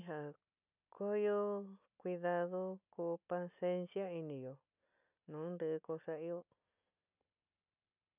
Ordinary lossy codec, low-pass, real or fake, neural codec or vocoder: none; 3.6 kHz; real; none